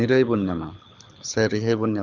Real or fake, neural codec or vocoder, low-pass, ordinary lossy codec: fake; codec, 24 kHz, 6 kbps, HILCodec; 7.2 kHz; none